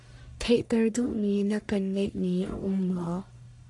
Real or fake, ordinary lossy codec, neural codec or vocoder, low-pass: fake; AAC, 64 kbps; codec, 44.1 kHz, 1.7 kbps, Pupu-Codec; 10.8 kHz